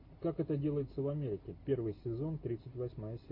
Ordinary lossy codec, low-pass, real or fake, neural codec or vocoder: MP3, 32 kbps; 5.4 kHz; real; none